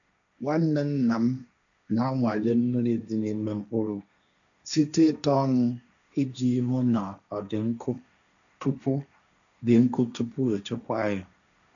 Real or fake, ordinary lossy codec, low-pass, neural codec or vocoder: fake; none; 7.2 kHz; codec, 16 kHz, 1.1 kbps, Voila-Tokenizer